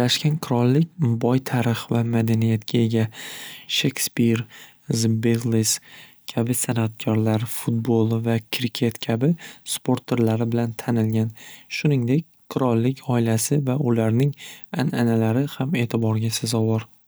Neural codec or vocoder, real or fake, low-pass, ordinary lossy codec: none; real; none; none